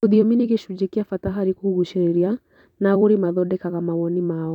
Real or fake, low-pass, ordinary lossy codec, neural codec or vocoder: fake; 19.8 kHz; none; vocoder, 44.1 kHz, 128 mel bands every 256 samples, BigVGAN v2